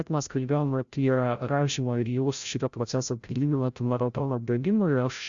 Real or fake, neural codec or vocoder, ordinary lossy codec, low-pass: fake; codec, 16 kHz, 0.5 kbps, FreqCodec, larger model; Opus, 64 kbps; 7.2 kHz